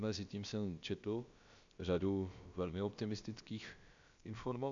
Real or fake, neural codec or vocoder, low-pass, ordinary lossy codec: fake; codec, 16 kHz, about 1 kbps, DyCAST, with the encoder's durations; 7.2 kHz; MP3, 64 kbps